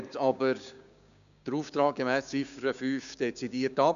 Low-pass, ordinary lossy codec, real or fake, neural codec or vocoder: 7.2 kHz; none; fake; codec, 16 kHz, 6 kbps, DAC